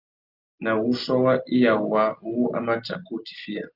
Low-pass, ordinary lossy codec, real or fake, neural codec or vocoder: 5.4 kHz; Opus, 32 kbps; real; none